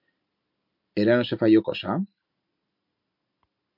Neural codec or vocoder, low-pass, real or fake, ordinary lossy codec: none; 5.4 kHz; real; MP3, 48 kbps